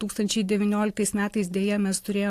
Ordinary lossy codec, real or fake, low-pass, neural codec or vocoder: AAC, 64 kbps; real; 14.4 kHz; none